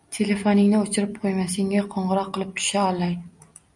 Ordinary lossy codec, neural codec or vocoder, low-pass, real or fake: MP3, 96 kbps; none; 10.8 kHz; real